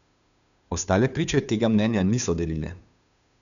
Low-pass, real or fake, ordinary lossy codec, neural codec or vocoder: 7.2 kHz; fake; none; codec, 16 kHz, 2 kbps, FunCodec, trained on Chinese and English, 25 frames a second